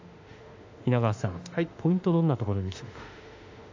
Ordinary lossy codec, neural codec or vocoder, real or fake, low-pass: AAC, 48 kbps; autoencoder, 48 kHz, 32 numbers a frame, DAC-VAE, trained on Japanese speech; fake; 7.2 kHz